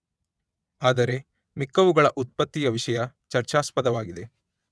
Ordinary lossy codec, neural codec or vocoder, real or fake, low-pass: none; vocoder, 22.05 kHz, 80 mel bands, WaveNeXt; fake; none